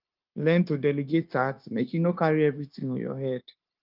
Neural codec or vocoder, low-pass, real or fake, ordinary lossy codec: codec, 16 kHz, 0.9 kbps, LongCat-Audio-Codec; 5.4 kHz; fake; Opus, 24 kbps